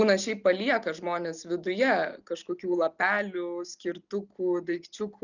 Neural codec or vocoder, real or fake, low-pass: none; real; 7.2 kHz